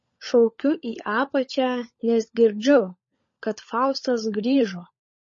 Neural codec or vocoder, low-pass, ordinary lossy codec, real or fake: codec, 16 kHz, 16 kbps, FunCodec, trained on LibriTTS, 50 frames a second; 7.2 kHz; MP3, 32 kbps; fake